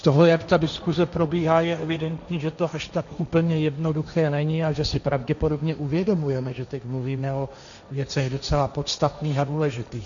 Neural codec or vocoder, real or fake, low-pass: codec, 16 kHz, 1.1 kbps, Voila-Tokenizer; fake; 7.2 kHz